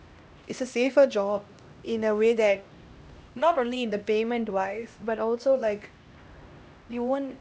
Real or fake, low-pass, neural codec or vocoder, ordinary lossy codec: fake; none; codec, 16 kHz, 1 kbps, X-Codec, HuBERT features, trained on LibriSpeech; none